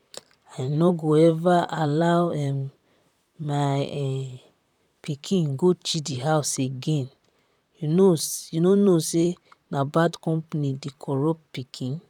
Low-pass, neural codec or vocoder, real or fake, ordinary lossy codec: 19.8 kHz; vocoder, 44.1 kHz, 128 mel bands, Pupu-Vocoder; fake; none